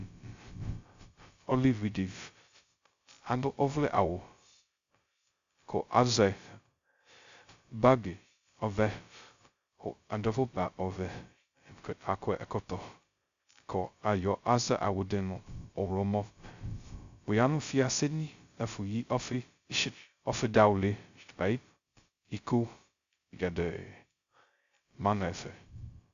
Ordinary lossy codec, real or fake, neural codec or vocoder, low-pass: Opus, 64 kbps; fake; codec, 16 kHz, 0.2 kbps, FocalCodec; 7.2 kHz